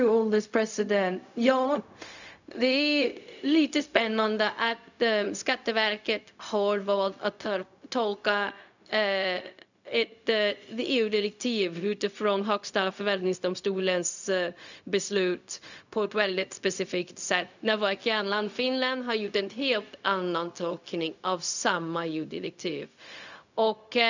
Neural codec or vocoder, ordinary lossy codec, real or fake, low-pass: codec, 16 kHz, 0.4 kbps, LongCat-Audio-Codec; none; fake; 7.2 kHz